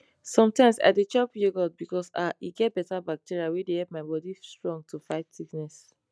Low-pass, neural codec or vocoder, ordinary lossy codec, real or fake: none; none; none; real